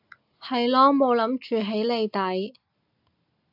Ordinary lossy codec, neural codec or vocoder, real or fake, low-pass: AAC, 48 kbps; none; real; 5.4 kHz